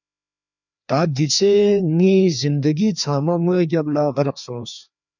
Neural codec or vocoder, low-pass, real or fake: codec, 16 kHz, 2 kbps, FreqCodec, larger model; 7.2 kHz; fake